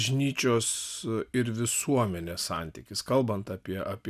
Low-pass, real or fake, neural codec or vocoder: 14.4 kHz; real; none